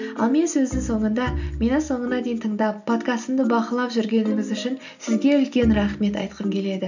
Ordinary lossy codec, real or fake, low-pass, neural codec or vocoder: none; real; 7.2 kHz; none